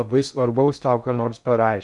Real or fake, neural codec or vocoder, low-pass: fake; codec, 16 kHz in and 24 kHz out, 0.6 kbps, FocalCodec, streaming, 2048 codes; 10.8 kHz